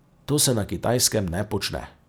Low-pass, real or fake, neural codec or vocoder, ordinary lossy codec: none; real; none; none